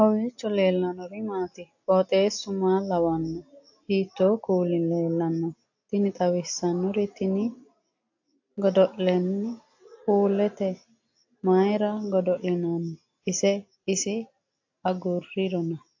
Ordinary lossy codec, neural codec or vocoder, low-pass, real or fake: AAC, 48 kbps; none; 7.2 kHz; real